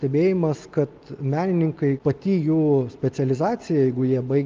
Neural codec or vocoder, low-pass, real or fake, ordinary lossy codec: none; 7.2 kHz; real; Opus, 32 kbps